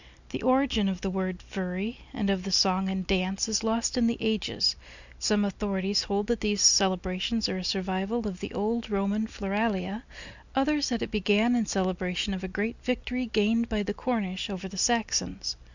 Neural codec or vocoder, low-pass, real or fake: none; 7.2 kHz; real